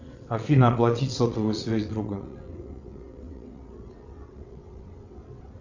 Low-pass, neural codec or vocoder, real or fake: 7.2 kHz; vocoder, 22.05 kHz, 80 mel bands, WaveNeXt; fake